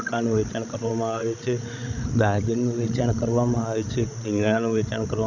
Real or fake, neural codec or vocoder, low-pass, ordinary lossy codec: fake; codec, 16 kHz, 16 kbps, FreqCodec, larger model; 7.2 kHz; none